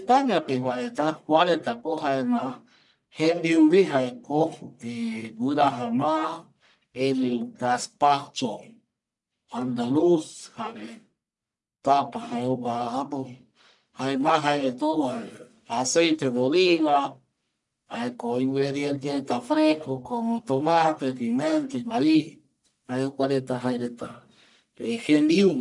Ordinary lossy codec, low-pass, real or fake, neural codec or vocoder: none; 10.8 kHz; fake; codec, 44.1 kHz, 1.7 kbps, Pupu-Codec